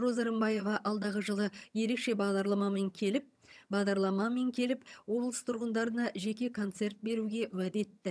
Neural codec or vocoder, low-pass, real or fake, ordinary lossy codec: vocoder, 22.05 kHz, 80 mel bands, HiFi-GAN; none; fake; none